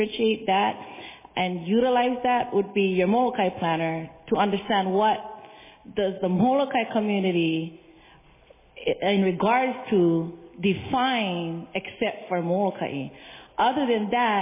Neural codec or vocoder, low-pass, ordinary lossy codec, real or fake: none; 3.6 kHz; MP3, 16 kbps; real